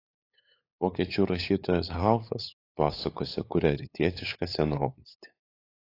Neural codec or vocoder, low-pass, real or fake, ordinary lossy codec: codec, 16 kHz, 8 kbps, FunCodec, trained on LibriTTS, 25 frames a second; 5.4 kHz; fake; AAC, 32 kbps